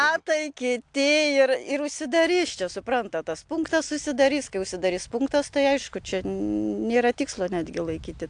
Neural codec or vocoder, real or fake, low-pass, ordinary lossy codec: none; real; 9.9 kHz; AAC, 64 kbps